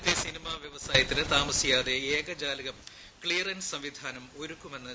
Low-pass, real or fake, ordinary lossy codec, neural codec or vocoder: none; real; none; none